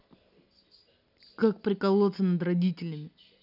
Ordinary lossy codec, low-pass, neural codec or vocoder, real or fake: none; 5.4 kHz; none; real